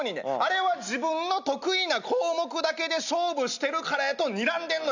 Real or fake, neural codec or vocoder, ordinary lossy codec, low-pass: real; none; none; 7.2 kHz